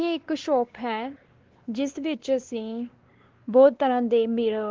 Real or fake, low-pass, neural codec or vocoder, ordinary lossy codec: fake; 7.2 kHz; codec, 16 kHz, 2 kbps, X-Codec, WavLM features, trained on Multilingual LibriSpeech; Opus, 16 kbps